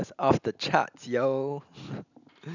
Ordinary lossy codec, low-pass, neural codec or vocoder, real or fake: none; 7.2 kHz; none; real